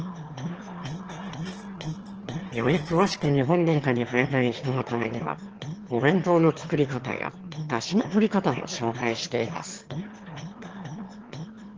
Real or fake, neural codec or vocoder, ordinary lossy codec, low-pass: fake; autoencoder, 22.05 kHz, a latent of 192 numbers a frame, VITS, trained on one speaker; Opus, 16 kbps; 7.2 kHz